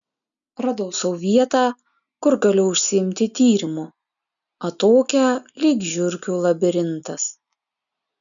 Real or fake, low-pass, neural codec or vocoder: real; 7.2 kHz; none